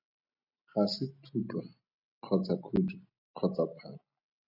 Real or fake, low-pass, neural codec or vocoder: real; 5.4 kHz; none